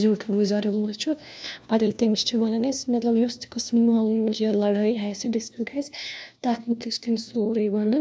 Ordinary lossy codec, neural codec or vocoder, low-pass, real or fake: none; codec, 16 kHz, 1 kbps, FunCodec, trained on LibriTTS, 50 frames a second; none; fake